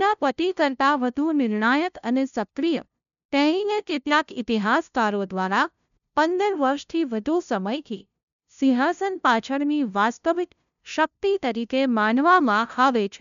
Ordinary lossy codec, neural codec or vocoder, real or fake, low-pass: none; codec, 16 kHz, 0.5 kbps, FunCodec, trained on LibriTTS, 25 frames a second; fake; 7.2 kHz